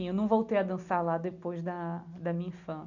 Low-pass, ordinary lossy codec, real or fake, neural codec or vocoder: 7.2 kHz; none; real; none